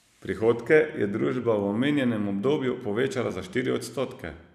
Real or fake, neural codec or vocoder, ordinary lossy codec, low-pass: fake; vocoder, 44.1 kHz, 128 mel bands every 256 samples, BigVGAN v2; none; 14.4 kHz